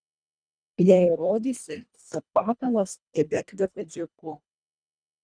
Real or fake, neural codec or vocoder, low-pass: fake; codec, 24 kHz, 1.5 kbps, HILCodec; 9.9 kHz